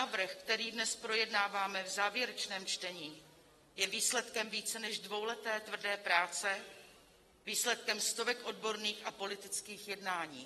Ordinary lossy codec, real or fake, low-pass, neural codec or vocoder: AAC, 32 kbps; real; 19.8 kHz; none